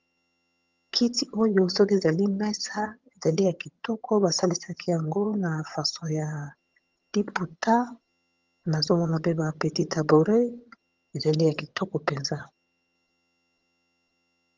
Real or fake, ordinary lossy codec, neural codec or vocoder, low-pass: fake; Opus, 32 kbps; vocoder, 22.05 kHz, 80 mel bands, HiFi-GAN; 7.2 kHz